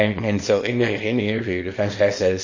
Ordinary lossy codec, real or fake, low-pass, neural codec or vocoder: MP3, 32 kbps; fake; 7.2 kHz; codec, 24 kHz, 0.9 kbps, WavTokenizer, small release